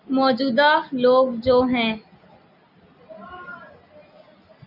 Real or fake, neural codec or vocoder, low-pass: real; none; 5.4 kHz